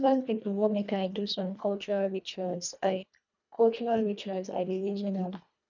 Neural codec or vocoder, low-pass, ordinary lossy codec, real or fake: codec, 24 kHz, 1.5 kbps, HILCodec; 7.2 kHz; none; fake